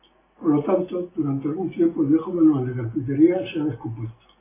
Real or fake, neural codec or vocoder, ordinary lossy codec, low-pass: real; none; AAC, 16 kbps; 3.6 kHz